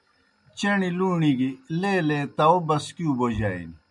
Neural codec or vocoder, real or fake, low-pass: none; real; 10.8 kHz